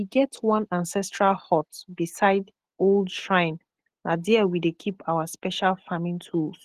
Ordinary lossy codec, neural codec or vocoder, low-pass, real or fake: Opus, 16 kbps; none; 14.4 kHz; real